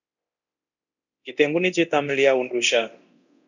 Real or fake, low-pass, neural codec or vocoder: fake; 7.2 kHz; codec, 24 kHz, 0.9 kbps, DualCodec